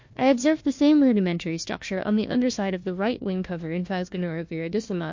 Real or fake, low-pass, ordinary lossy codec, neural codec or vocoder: fake; 7.2 kHz; MP3, 48 kbps; codec, 16 kHz, 1 kbps, FunCodec, trained on Chinese and English, 50 frames a second